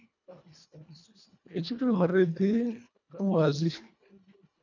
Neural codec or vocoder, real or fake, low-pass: codec, 24 kHz, 1.5 kbps, HILCodec; fake; 7.2 kHz